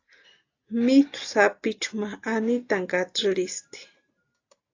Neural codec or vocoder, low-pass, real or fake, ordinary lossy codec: none; 7.2 kHz; real; AAC, 48 kbps